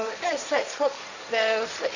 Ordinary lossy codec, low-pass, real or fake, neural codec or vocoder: none; 7.2 kHz; fake; codec, 16 kHz, 1.1 kbps, Voila-Tokenizer